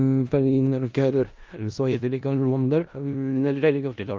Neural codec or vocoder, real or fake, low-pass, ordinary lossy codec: codec, 16 kHz in and 24 kHz out, 0.4 kbps, LongCat-Audio-Codec, four codebook decoder; fake; 7.2 kHz; Opus, 24 kbps